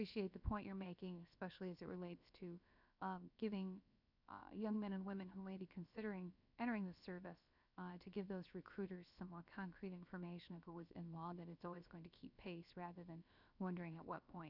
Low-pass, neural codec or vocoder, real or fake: 5.4 kHz; codec, 16 kHz, about 1 kbps, DyCAST, with the encoder's durations; fake